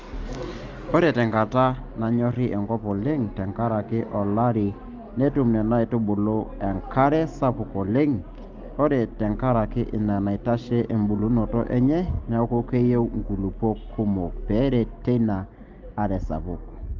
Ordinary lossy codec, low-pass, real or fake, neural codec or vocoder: Opus, 32 kbps; 7.2 kHz; real; none